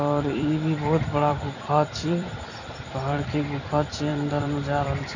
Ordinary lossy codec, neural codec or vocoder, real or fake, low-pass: none; vocoder, 44.1 kHz, 128 mel bands every 256 samples, BigVGAN v2; fake; 7.2 kHz